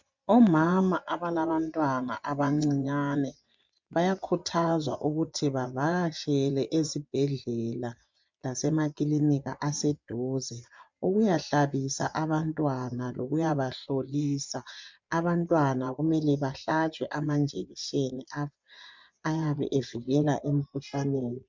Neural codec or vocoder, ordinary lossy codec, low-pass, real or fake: vocoder, 24 kHz, 100 mel bands, Vocos; MP3, 64 kbps; 7.2 kHz; fake